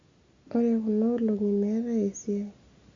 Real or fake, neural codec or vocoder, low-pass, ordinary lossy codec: real; none; 7.2 kHz; Opus, 64 kbps